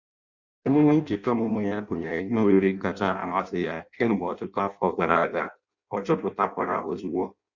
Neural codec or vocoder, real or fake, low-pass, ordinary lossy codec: codec, 16 kHz in and 24 kHz out, 0.6 kbps, FireRedTTS-2 codec; fake; 7.2 kHz; Opus, 64 kbps